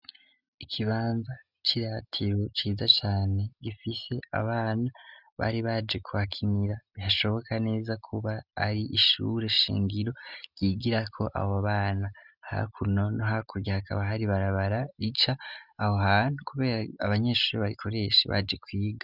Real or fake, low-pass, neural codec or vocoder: real; 5.4 kHz; none